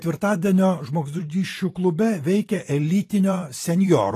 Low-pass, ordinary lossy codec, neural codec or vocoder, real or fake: 14.4 kHz; AAC, 48 kbps; vocoder, 44.1 kHz, 128 mel bands every 512 samples, BigVGAN v2; fake